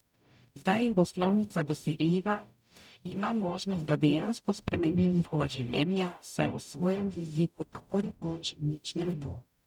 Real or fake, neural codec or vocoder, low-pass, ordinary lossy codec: fake; codec, 44.1 kHz, 0.9 kbps, DAC; 19.8 kHz; none